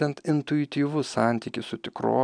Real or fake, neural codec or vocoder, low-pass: real; none; 9.9 kHz